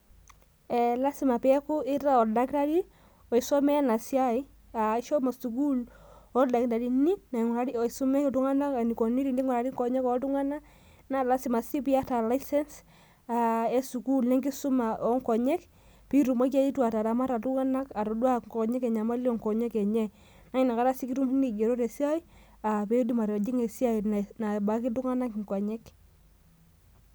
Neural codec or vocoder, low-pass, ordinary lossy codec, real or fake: none; none; none; real